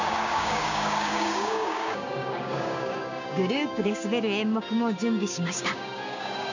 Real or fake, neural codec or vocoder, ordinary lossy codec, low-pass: fake; codec, 16 kHz, 6 kbps, DAC; none; 7.2 kHz